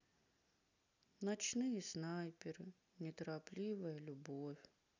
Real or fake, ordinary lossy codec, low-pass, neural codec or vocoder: real; none; 7.2 kHz; none